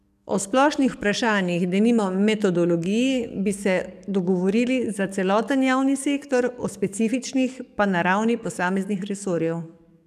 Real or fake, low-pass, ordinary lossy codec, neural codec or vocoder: fake; 14.4 kHz; none; codec, 44.1 kHz, 7.8 kbps, DAC